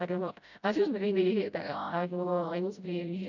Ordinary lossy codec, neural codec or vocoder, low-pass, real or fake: none; codec, 16 kHz, 0.5 kbps, FreqCodec, smaller model; 7.2 kHz; fake